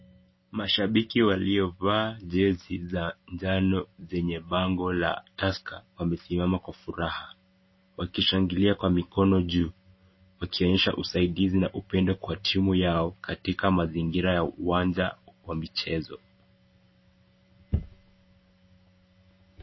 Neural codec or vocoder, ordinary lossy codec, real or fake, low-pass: none; MP3, 24 kbps; real; 7.2 kHz